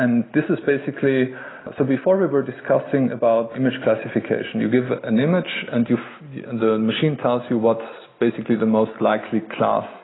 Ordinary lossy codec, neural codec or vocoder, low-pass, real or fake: AAC, 16 kbps; none; 7.2 kHz; real